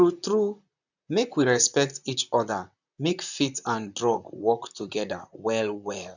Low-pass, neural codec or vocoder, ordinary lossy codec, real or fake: 7.2 kHz; vocoder, 44.1 kHz, 128 mel bands, Pupu-Vocoder; none; fake